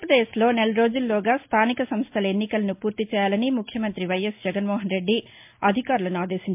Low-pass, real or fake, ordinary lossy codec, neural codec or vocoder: 3.6 kHz; real; MP3, 32 kbps; none